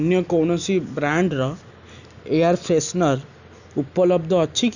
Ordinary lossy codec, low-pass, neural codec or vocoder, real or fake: none; 7.2 kHz; none; real